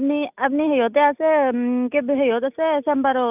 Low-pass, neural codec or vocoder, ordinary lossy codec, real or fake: 3.6 kHz; none; none; real